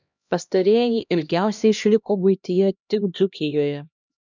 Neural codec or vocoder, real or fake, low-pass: codec, 16 kHz, 1 kbps, X-Codec, HuBERT features, trained on LibriSpeech; fake; 7.2 kHz